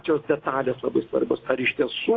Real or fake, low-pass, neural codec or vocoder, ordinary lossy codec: real; 7.2 kHz; none; AAC, 32 kbps